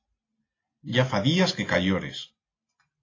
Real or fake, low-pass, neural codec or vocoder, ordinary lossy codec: real; 7.2 kHz; none; AAC, 32 kbps